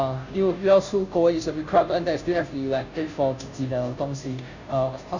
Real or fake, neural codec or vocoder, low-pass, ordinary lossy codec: fake; codec, 16 kHz, 0.5 kbps, FunCodec, trained on Chinese and English, 25 frames a second; 7.2 kHz; none